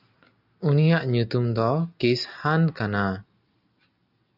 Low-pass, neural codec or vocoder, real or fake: 5.4 kHz; none; real